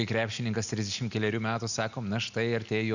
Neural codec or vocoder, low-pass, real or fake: none; 7.2 kHz; real